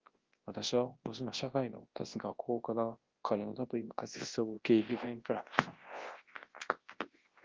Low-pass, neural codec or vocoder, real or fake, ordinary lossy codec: 7.2 kHz; codec, 24 kHz, 0.9 kbps, WavTokenizer, large speech release; fake; Opus, 16 kbps